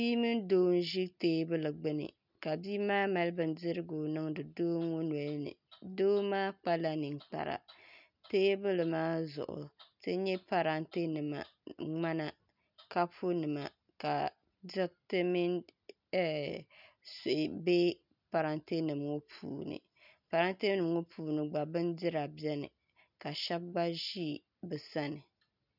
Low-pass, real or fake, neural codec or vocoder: 5.4 kHz; real; none